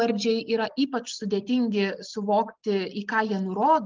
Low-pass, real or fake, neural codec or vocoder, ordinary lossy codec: 7.2 kHz; real; none; Opus, 32 kbps